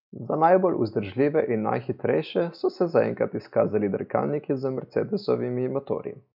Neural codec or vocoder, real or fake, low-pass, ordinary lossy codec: none; real; 5.4 kHz; none